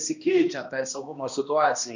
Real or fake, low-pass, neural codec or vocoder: fake; 7.2 kHz; codec, 16 kHz, 1 kbps, X-Codec, HuBERT features, trained on balanced general audio